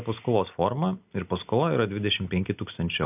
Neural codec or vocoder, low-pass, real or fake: none; 3.6 kHz; real